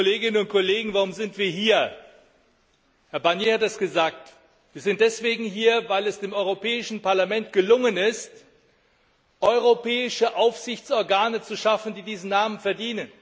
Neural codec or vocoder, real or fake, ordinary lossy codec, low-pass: none; real; none; none